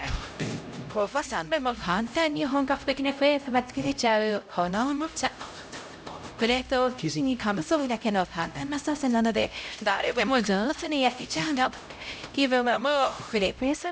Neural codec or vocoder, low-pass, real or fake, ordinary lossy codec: codec, 16 kHz, 0.5 kbps, X-Codec, HuBERT features, trained on LibriSpeech; none; fake; none